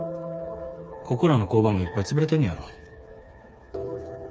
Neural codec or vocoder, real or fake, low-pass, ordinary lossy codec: codec, 16 kHz, 4 kbps, FreqCodec, smaller model; fake; none; none